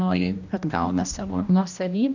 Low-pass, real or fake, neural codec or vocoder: 7.2 kHz; fake; codec, 16 kHz, 1 kbps, X-Codec, HuBERT features, trained on general audio